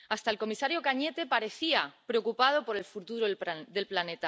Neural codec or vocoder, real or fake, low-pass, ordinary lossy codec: none; real; none; none